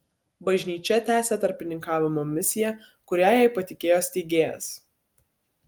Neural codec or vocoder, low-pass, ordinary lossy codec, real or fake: none; 19.8 kHz; Opus, 32 kbps; real